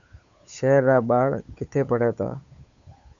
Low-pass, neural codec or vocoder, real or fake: 7.2 kHz; codec, 16 kHz, 8 kbps, FunCodec, trained on Chinese and English, 25 frames a second; fake